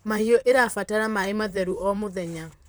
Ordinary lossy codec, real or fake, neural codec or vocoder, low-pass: none; fake; vocoder, 44.1 kHz, 128 mel bands, Pupu-Vocoder; none